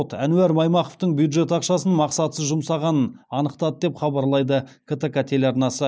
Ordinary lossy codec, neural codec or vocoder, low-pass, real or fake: none; none; none; real